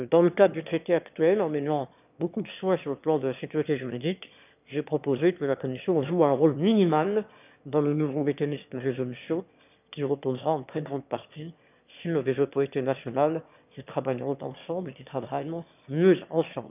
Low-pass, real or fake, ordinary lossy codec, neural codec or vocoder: 3.6 kHz; fake; none; autoencoder, 22.05 kHz, a latent of 192 numbers a frame, VITS, trained on one speaker